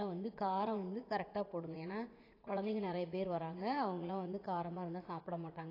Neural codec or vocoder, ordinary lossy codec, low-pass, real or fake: vocoder, 44.1 kHz, 80 mel bands, Vocos; AAC, 24 kbps; 5.4 kHz; fake